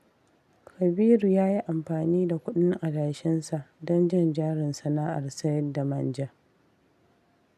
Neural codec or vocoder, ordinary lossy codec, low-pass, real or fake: none; none; 14.4 kHz; real